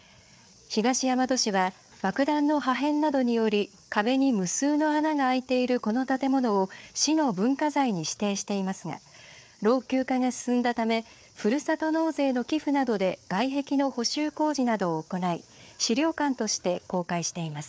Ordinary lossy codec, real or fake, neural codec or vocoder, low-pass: none; fake; codec, 16 kHz, 4 kbps, FreqCodec, larger model; none